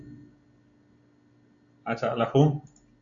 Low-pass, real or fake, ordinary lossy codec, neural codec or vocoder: 7.2 kHz; real; MP3, 96 kbps; none